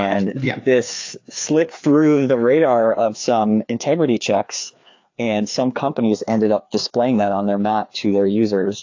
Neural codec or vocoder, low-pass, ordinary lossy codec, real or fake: codec, 16 kHz, 2 kbps, FreqCodec, larger model; 7.2 kHz; AAC, 48 kbps; fake